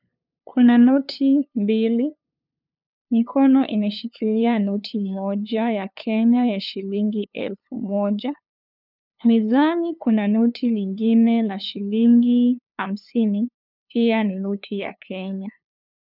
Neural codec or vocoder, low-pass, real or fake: codec, 16 kHz, 2 kbps, FunCodec, trained on LibriTTS, 25 frames a second; 5.4 kHz; fake